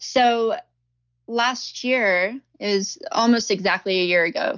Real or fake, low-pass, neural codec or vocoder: real; 7.2 kHz; none